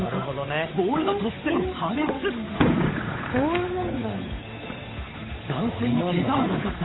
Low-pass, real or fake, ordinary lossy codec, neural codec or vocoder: 7.2 kHz; fake; AAC, 16 kbps; codec, 16 kHz, 8 kbps, FunCodec, trained on Chinese and English, 25 frames a second